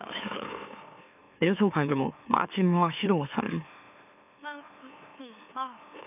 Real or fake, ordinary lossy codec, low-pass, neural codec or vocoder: fake; none; 3.6 kHz; autoencoder, 44.1 kHz, a latent of 192 numbers a frame, MeloTTS